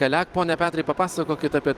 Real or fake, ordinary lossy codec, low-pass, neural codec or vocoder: fake; Opus, 24 kbps; 14.4 kHz; vocoder, 44.1 kHz, 128 mel bands every 256 samples, BigVGAN v2